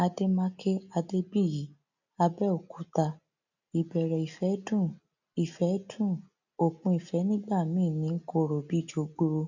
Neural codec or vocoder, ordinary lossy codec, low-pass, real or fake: none; none; 7.2 kHz; real